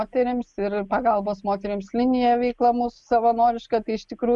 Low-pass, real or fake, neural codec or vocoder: 10.8 kHz; real; none